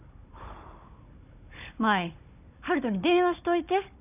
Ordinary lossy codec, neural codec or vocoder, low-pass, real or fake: none; codec, 16 kHz, 16 kbps, FunCodec, trained on Chinese and English, 50 frames a second; 3.6 kHz; fake